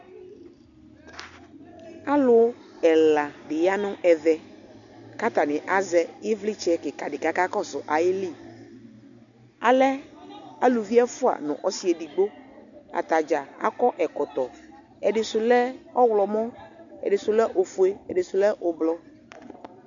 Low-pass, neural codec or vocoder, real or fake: 7.2 kHz; none; real